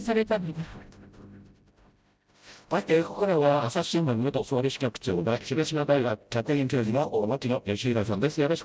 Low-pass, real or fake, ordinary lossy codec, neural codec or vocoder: none; fake; none; codec, 16 kHz, 0.5 kbps, FreqCodec, smaller model